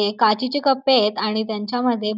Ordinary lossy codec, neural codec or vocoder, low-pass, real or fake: none; none; 5.4 kHz; real